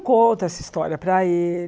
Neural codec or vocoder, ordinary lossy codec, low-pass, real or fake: none; none; none; real